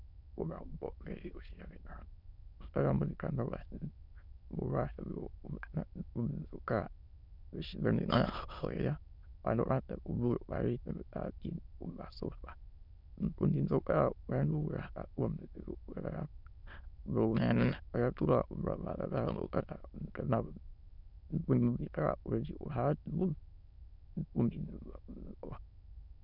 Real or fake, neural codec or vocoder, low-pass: fake; autoencoder, 22.05 kHz, a latent of 192 numbers a frame, VITS, trained on many speakers; 5.4 kHz